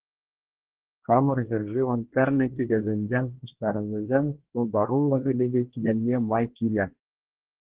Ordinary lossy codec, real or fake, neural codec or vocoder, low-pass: Opus, 16 kbps; fake; codec, 24 kHz, 1 kbps, SNAC; 3.6 kHz